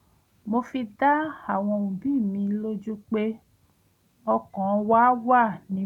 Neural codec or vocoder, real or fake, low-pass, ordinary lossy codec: none; real; 19.8 kHz; none